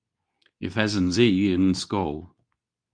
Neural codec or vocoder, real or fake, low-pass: codec, 24 kHz, 0.9 kbps, WavTokenizer, medium speech release version 2; fake; 9.9 kHz